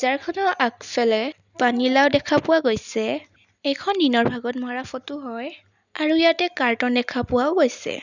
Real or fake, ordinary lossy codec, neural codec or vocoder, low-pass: real; none; none; 7.2 kHz